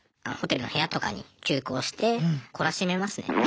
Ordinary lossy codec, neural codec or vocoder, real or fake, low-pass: none; none; real; none